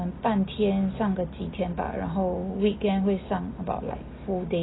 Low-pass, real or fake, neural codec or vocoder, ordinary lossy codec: 7.2 kHz; real; none; AAC, 16 kbps